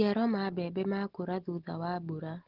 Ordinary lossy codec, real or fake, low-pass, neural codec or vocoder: Opus, 16 kbps; real; 5.4 kHz; none